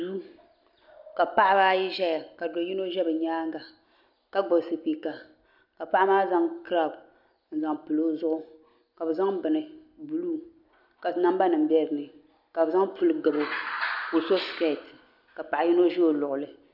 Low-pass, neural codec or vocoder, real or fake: 5.4 kHz; none; real